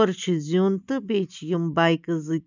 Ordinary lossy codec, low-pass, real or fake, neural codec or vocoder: none; 7.2 kHz; real; none